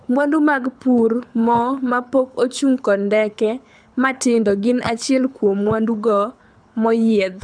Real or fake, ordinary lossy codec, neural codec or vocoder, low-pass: fake; none; codec, 24 kHz, 6 kbps, HILCodec; 9.9 kHz